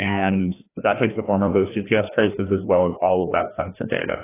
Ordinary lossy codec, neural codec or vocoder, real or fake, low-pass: AAC, 16 kbps; codec, 16 kHz, 1 kbps, FreqCodec, larger model; fake; 3.6 kHz